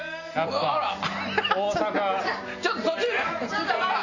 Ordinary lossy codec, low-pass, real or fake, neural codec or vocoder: AAC, 48 kbps; 7.2 kHz; real; none